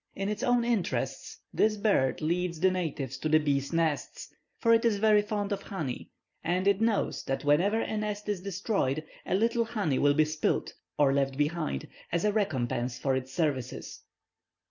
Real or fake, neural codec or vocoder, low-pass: real; none; 7.2 kHz